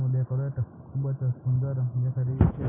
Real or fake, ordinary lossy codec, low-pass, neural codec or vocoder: real; none; 3.6 kHz; none